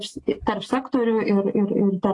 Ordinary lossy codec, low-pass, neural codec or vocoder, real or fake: AAC, 32 kbps; 10.8 kHz; none; real